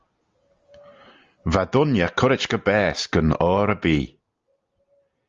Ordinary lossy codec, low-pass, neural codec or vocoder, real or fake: Opus, 32 kbps; 7.2 kHz; none; real